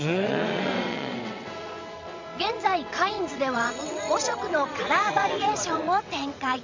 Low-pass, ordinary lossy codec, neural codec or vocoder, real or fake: 7.2 kHz; MP3, 64 kbps; vocoder, 22.05 kHz, 80 mel bands, WaveNeXt; fake